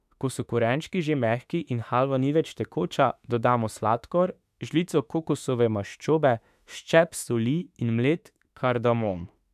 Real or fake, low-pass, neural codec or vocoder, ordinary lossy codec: fake; 14.4 kHz; autoencoder, 48 kHz, 32 numbers a frame, DAC-VAE, trained on Japanese speech; none